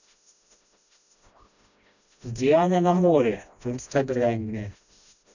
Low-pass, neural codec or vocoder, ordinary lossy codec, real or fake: 7.2 kHz; codec, 16 kHz, 1 kbps, FreqCodec, smaller model; none; fake